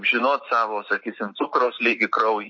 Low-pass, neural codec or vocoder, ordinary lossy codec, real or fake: 7.2 kHz; none; MP3, 32 kbps; real